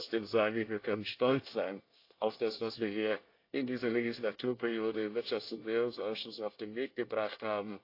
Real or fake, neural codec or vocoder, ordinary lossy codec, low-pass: fake; codec, 24 kHz, 1 kbps, SNAC; AAC, 32 kbps; 5.4 kHz